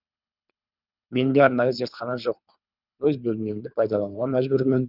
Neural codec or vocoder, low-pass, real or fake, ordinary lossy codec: codec, 24 kHz, 3 kbps, HILCodec; 5.4 kHz; fake; none